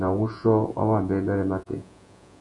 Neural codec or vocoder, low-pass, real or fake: vocoder, 48 kHz, 128 mel bands, Vocos; 10.8 kHz; fake